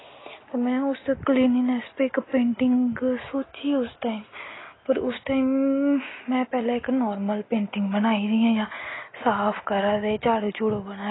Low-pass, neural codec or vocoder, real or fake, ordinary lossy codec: 7.2 kHz; none; real; AAC, 16 kbps